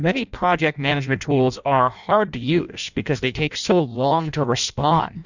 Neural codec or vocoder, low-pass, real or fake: codec, 16 kHz in and 24 kHz out, 0.6 kbps, FireRedTTS-2 codec; 7.2 kHz; fake